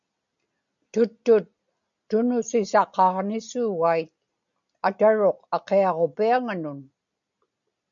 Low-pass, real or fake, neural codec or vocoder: 7.2 kHz; real; none